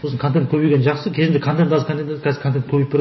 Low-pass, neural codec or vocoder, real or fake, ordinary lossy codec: 7.2 kHz; none; real; MP3, 24 kbps